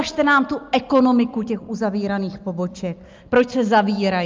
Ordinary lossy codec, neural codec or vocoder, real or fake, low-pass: Opus, 24 kbps; none; real; 7.2 kHz